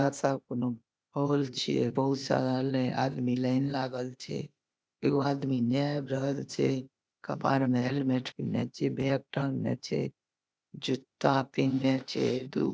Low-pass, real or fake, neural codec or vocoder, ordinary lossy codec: none; fake; codec, 16 kHz, 0.8 kbps, ZipCodec; none